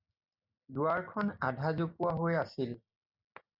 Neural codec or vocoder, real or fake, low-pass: none; real; 5.4 kHz